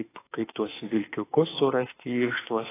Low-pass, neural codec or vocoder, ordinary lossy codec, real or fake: 3.6 kHz; autoencoder, 48 kHz, 32 numbers a frame, DAC-VAE, trained on Japanese speech; AAC, 16 kbps; fake